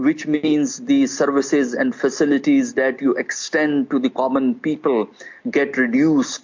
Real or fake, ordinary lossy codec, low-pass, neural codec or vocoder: real; MP3, 48 kbps; 7.2 kHz; none